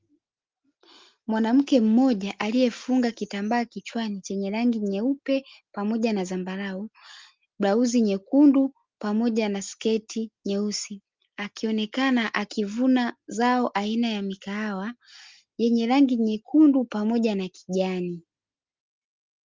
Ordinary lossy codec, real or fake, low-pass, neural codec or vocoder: Opus, 32 kbps; real; 7.2 kHz; none